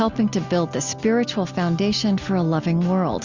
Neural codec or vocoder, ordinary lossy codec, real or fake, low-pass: none; Opus, 64 kbps; real; 7.2 kHz